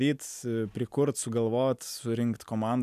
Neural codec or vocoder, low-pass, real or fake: none; 14.4 kHz; real